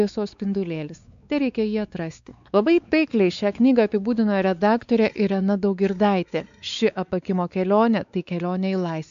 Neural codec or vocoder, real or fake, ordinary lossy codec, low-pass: codec, 16 kHz, 4 kbps, X-Codec, WavLM features, trained on Multilingual LibriSpeech; fake; AAC, 96 kbps; 7.2 kHz